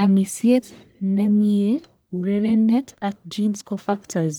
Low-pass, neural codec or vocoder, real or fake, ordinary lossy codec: none; codec, 44.1 kHz, 1.7 kbps, Pupu-Codec; fake; none